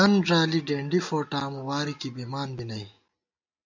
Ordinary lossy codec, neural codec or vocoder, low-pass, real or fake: MP3, 64 kbps; none; 7.2 kHz; real